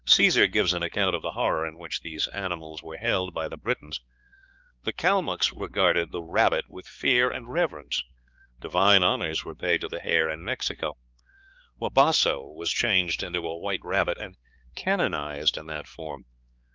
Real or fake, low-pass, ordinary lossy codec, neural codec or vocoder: fake; 7.2 kHz; Opus, 32 kbps; codec, 16 kHz, 4 kbps, X-Codec, HuBERT features, trained on balanced general audio